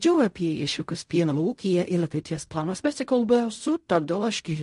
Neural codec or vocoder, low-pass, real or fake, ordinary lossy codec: codec, 16 kHz in and 24 kHz out, 0.4 kbps, LongCat-Audio-Codec, fine tuned four codebook decoder; 10.8 kHz; fake; MP3, 48 kbps